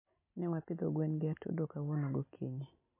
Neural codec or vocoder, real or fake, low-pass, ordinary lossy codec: none; real; 3.6 kHz; MP3, 24 kbps